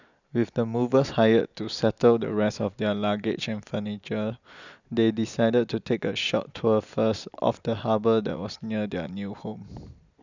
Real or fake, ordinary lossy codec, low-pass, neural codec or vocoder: real; none; 7.2 kHz; none